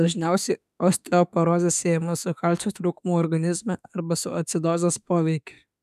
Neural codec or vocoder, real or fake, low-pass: autoencoder, 48 kHz, 32 numbers a frame, DAC-VAE, trained on Japanese speech; fake; 14.4 kHz